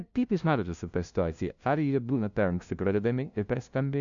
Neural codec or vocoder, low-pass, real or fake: codec, 16 kHz, 0.5 kbps, FunCodec, trained on LibriTTS, 25 frames a second; 7.2 kHz; fake